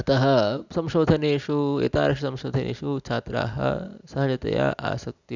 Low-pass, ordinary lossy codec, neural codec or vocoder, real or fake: 7.2 kHz; none; none; real